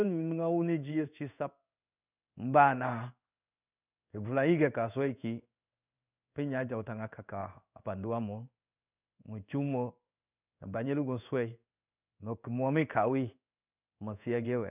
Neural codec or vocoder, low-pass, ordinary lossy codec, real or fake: codec, 16 kHz in and 24 kHz out, 1 kbps, XY-Tokenizer; 3.6 kHz; none; fake